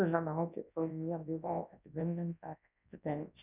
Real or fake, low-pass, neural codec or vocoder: fake; 3.6 kHz; codec, 24 kHz, 0.9 kbps, WavTokenizer, large speech release